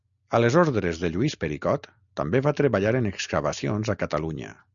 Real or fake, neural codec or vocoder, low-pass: real; none; 7.2 kHz